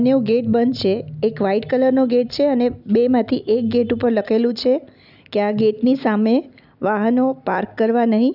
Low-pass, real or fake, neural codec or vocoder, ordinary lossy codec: 5.4 kHz; real; none; none